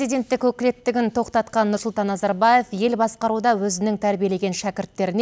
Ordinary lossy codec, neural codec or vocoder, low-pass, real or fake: none; none; none; real